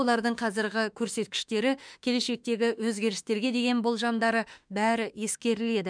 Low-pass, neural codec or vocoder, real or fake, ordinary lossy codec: 9.9 kHz; autoencoder, 48 kHz, 32 numbers a frame, DAC-VAE, trained on Japanese speech; fake; none